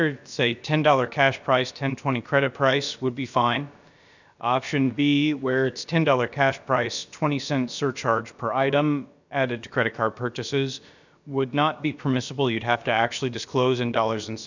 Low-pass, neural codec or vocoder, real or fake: 7.2 kHz; codec, 16 kHz, about 1 kbps, DyCAST, with the encoder's durations; fake